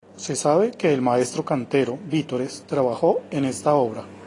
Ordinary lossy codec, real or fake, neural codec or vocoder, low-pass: AAC, 32 kbps; real; none; 10.8 kHz